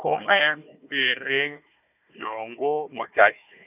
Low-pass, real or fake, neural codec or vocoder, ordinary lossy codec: 3.6 kHz; fake; codec, 16 kHz, 1 kbps, FunCodec, trained on Chinese and English, 50 frames a second; none